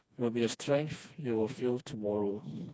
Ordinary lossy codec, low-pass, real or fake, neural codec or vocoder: none; none; fake; codec, 16 kHz, 2 kbps, FreqCodec, smaller model